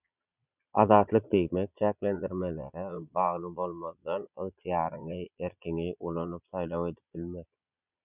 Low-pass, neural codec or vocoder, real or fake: 3.6 kHz; vocoder, 22.05 kHz, 80 mel bands, Vocos; fake